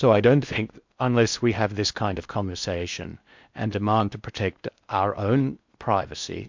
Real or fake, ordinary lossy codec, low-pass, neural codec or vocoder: fake; MP3, 64 kbps; 7.2 kHz; codec, 16 kHz in and 24 kHz out, 0.8 kbps, FocalCodec, streaming, 65536 codes